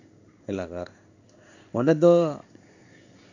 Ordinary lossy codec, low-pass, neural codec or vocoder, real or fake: none; 7.2 kHz; codec, 16 kHz in and 24 kHz out, 1 kbps, XY-Tokenizer; fake